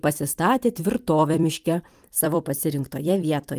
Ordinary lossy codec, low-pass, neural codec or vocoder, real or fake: Opus, 32 kbps; 14.4 kHz; vocoder, 44.1 kHz, 128 mel bands every 256 samples, BigVGAN v2; fake